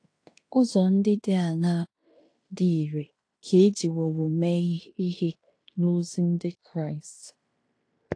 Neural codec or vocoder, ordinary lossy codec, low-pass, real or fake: codec, 16 kHz in and 24 kHz out, 0.9 kbps, LongCat-Audio-Codec, fine tuned four codebook decoder; AAC, 48 kbps; 9.9 kHz; fake